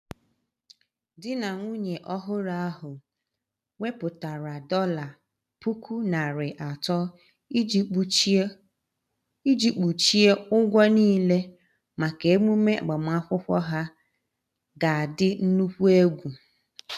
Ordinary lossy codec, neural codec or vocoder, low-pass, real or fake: none; none; 14.4 kHz; real